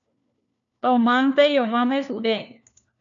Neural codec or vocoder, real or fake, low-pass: codec, 16 kHz, 1 kbps, FunCodec, trained on LibriTTS, 50 frames a second; fake; 7.2 kHz